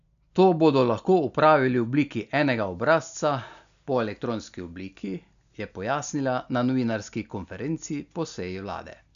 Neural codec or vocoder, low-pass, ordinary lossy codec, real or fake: none; 7.2 kHz; none; real